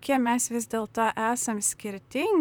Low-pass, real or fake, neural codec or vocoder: 19.8 kHz; fake; vocoder, 44.1 kHz, 128 mel bands, Pupu-Vocoder